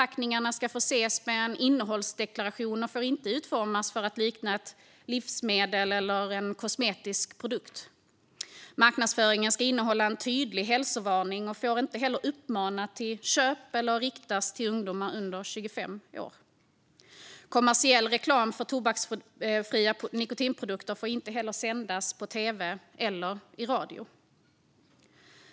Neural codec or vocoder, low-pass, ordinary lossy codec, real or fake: none; none; none; real